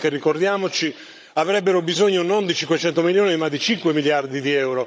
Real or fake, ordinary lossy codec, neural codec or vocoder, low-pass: fake; none; codec, 16 kHz, 16 kbps, FunCodec, trained on Chinese and English, 50 frames a second; none